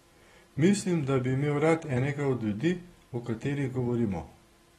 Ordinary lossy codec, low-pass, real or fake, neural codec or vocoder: AAC, 32 kbps; 19.8 kHz; real; none